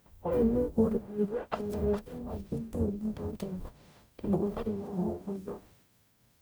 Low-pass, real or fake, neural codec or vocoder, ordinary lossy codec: none; fake; codec, 44.1 kHz, 0.9 kbps, DAC; none